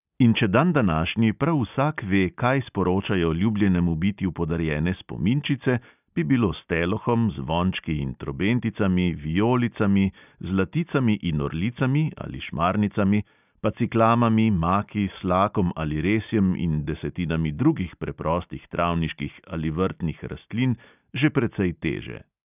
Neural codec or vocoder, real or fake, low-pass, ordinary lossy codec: none; real; 3.6 kHz; none